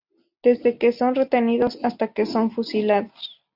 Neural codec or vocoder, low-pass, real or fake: none; 5.4 kHz; real